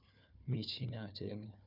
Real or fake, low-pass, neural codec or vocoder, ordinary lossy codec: fake; 5.4 kHz; codec, 16 kHz, 4 kbps, FunCodec, trained on Chinese and English, 50 frames a second; none